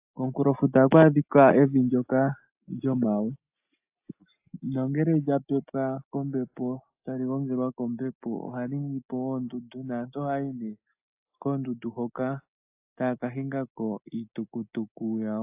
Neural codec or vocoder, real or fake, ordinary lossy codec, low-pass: none; real; AAC, 32 kbps; 3.6 kHz